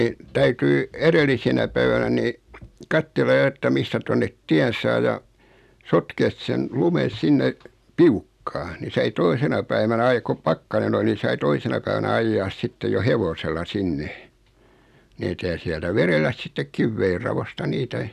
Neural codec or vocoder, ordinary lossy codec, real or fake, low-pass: none; none; real; 14.4 kHz